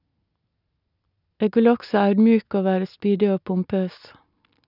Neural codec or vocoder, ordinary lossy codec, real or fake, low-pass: none; none; real; 5.4 kHz